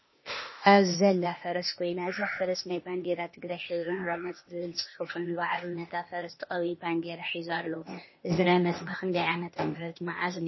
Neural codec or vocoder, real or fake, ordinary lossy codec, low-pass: codec, 16 kHz, 0.8 kbps, ZipCodec; fake; MP3, 24 kbps; 7.2 kHz